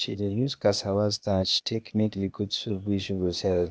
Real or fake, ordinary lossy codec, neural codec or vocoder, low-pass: fake; none; codec, 16 kHz, 0.8 kbps, ZipCodec; none